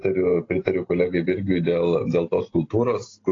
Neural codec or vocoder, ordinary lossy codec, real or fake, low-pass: none; AAC, 32 kbps; real; 7.2 kHz